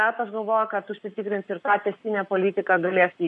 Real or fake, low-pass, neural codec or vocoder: fake; 10.8 kHz; codec, 44.1 kHz, 7.8 kbps, Pupu-Codec